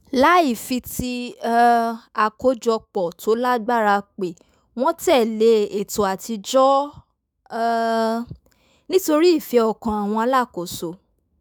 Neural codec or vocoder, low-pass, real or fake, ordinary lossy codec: autoencoder, 48 kHz, 128 numbers a frame, DAC-VAE, trained on Japanese speech; none; fake; none